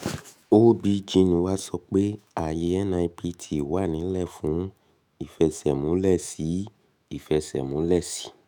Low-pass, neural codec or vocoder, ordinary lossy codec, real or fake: none; autoencoder, 48 kHz, 128 numbers a frame, DAC-VAE, trained on Japanese speech; none; fake